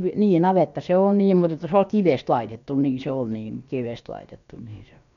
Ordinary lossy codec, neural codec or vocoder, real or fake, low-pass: MP3, 96 kbps; codec, 16 kHz, about 1 kbps, DyCAST, with the encoder's durations; fake; 7.2 kHz